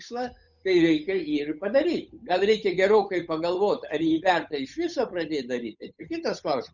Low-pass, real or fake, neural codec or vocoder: 7.2 kHz; fake; codec, 16 kHz, 8 kbps, FunCodec, trained on Chinese and English, 25 frames a second